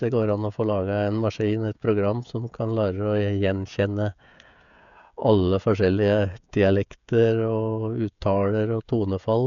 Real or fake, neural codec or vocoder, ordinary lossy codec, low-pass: fake; codec, 16 kHz, 16 kbps, FreqCodec, smaller model; none; 7.2 kHz